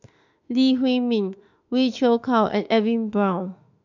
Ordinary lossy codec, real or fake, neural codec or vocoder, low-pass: none; fake; autoencoder, 48 kHz, 32 numbers a frame, DAC-VAE, trained on Japanese speech; 7.2 kHz